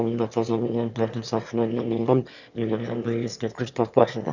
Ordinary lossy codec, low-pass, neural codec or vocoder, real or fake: none; 7.2 kHz; autoencoder, 22.05 kHz, a latent of 192 numbers a frame, VITS, trained on one speaker; fake